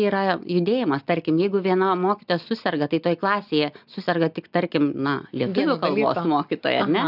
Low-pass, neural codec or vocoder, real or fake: 5.4 kHz; none; real